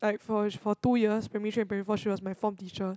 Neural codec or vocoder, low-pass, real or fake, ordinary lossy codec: none; none; real; none